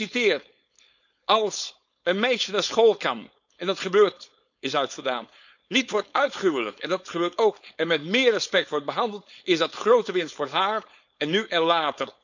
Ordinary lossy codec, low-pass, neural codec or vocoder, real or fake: none; 7.2 kHz; codec, 16 kHz, 4.8 kbps, FACodec; fake